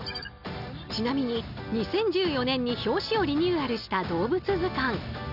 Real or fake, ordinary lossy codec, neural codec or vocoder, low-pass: real; none; none; 5.4 kHz